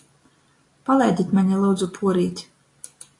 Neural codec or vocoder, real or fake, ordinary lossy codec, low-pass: none; real; AAC, 48 kbps; 10.8 kHz